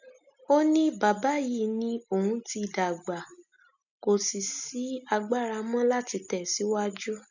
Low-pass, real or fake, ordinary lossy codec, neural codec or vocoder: 7.2 kHz; real; none; none